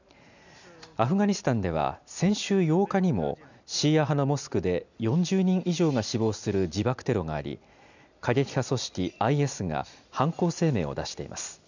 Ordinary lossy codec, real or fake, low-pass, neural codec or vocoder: none; real; 7.2 kHz; none